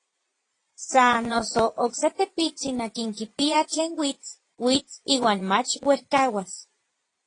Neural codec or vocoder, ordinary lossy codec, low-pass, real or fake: vocoder, 22.05 kHz, 80 mel bands, Vocos; AAC, 32 kbps; 9.9 kHz; fake